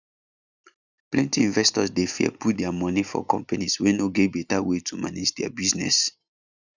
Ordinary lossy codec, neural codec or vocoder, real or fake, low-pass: none; none; real; 7.2 kHz